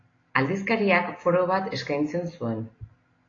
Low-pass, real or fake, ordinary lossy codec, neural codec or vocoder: 7.2 kHz; real; AAC, 32 kbps; none